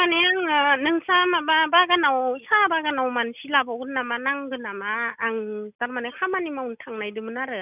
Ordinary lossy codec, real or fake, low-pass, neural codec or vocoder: none; real; 3.6 kHz; none